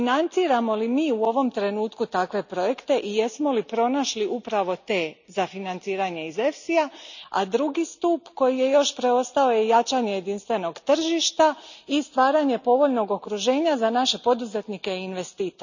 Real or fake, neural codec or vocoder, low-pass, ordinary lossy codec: real; none; 7.2 kHz; none